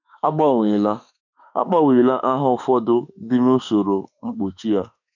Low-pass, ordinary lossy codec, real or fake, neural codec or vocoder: 7.2 kHz; none; fake; autoencoder, 48 kHz, 32 numbers a frame, DAC-VAE, trained on Japanese speech